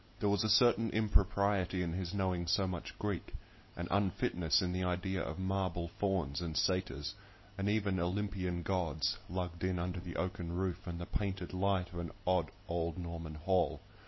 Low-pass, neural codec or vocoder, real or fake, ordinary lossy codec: 7.2 kHz; none; real; MP3, 24 kbps